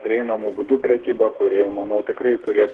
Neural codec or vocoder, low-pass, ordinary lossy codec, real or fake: codec, 32 kHz, 1.9 kbps, SNAC; 10.8 kHz; Opus, 16 kbps; fake